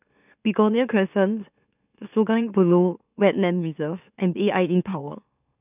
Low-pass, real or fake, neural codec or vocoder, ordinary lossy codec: 3.6 kHz; fake; autoencoder, 44.1 kHz, a latent of 192 numbers a frame, MeloTTS; none